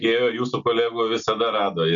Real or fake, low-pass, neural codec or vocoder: real; 7.2 kHz; none